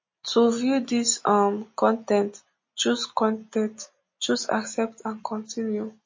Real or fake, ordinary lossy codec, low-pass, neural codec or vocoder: real; MP3, 32 kbps; 7.2 kHz; none